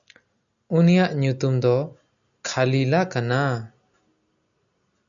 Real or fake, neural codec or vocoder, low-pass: real; none; 7.2 kHz